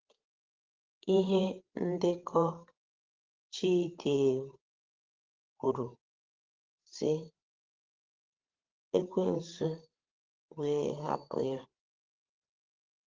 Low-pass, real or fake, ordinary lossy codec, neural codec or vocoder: 7.2 kHz; fake; Opus, 16 kbps; codec, 16 kHz, 8 kbps, FreqCodec, larger model